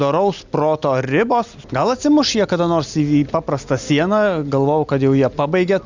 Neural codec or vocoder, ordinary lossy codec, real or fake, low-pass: none; Opus, 64 kbps; real; 7.2 kHz